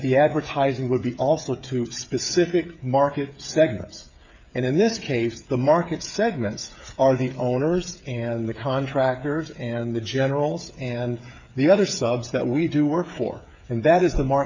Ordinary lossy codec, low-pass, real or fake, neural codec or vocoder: MP3, 64 kbps; 7.2 kHz; fake; codec, 16 kHz, 8 kbps, FreqCodec, smaller model